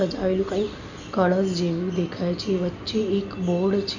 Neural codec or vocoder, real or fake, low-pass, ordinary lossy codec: none; real; 7.2 kHz; none